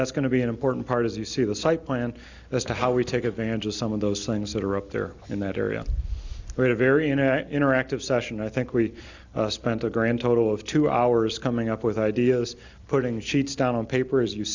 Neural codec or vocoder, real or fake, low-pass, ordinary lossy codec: none; real; 7.2 kHz; Opus, 64 kbps